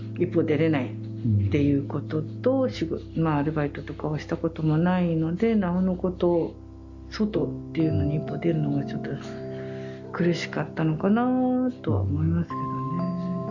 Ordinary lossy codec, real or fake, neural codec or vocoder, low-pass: Opus, 64 kbps; real; none; 7.2 kHz